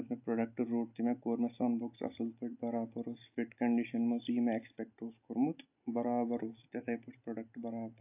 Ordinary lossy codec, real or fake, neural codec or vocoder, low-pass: AAC, 32 kbps; real; none; 3.6 kHz